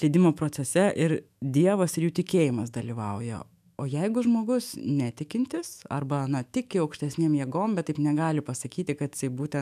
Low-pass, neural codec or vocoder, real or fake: 14.4 kHz; autoencoder, 48 kHz, 128 numbers a frame, DAC-VAE, trained on Japanese speech; fake